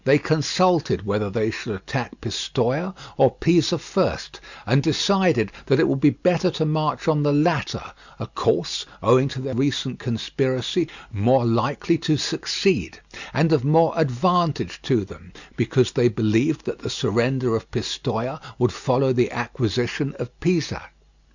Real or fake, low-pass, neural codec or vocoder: real; 7.2 kHz; none